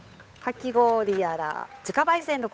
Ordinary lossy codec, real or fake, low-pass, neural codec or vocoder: none; fake; none; codec, 16 kHz, 8 kbps, FunCodec, trained on Chinese and English, 25 frames a second